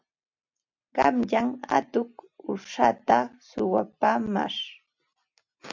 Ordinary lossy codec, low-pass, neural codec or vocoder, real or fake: MP3, 64 kbps; 7.2 kHz; none; real